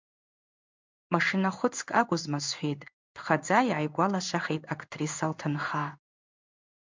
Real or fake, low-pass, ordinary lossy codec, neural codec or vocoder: fake; 7.2 kHz; MP3, 64 kbps; codec, 16 kHz in and 24 kHz out, 1 kbps, XY-Tokenizer